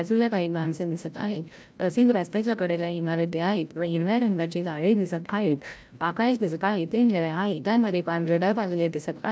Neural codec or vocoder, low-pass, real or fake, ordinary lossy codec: codec, 16 kHz, 0.5 kbps, FreqCodec, larger model; none; fake; none